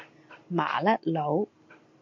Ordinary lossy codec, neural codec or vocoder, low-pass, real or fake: MP3, 48 kbps; none; 7.2 kHz; real